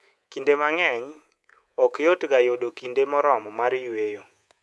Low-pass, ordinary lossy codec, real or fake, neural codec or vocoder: none; none; fake; codec, 24 kHz, 3.1 kbps, DualCodec